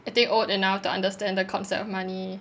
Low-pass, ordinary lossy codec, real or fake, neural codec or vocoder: none; none; real; none